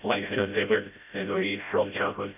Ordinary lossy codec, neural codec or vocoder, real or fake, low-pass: none; codec, 16 kHz, 0.5 kbps, FreqCodec, smaller model; fake; 3.6 kHz